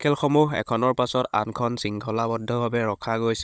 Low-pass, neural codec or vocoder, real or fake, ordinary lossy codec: none; codec, 16 kHz, 16 kbps, FunCodec, trained on Chinese and English, 50 frames a second; fake; none